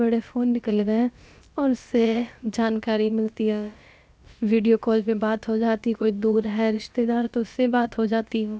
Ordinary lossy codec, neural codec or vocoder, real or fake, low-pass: none; codec, 16 kHz, about 1 kbps, DyCAST, with the encoder's durations; fake; none